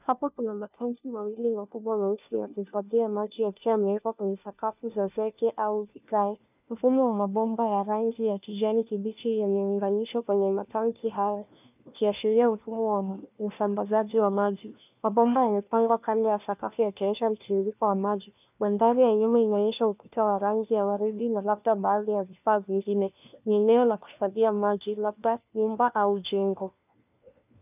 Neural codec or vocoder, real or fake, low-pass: codec, 16 kHz, 1 kbps, FunCodec, trained on LibriTTS, 50 frames a second; fake; 3.6 kHz